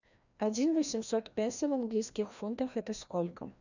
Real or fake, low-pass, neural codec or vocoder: fake; 7.2 kHz; codec, 16 kHz, 1 kbps, FreqCodec, larger model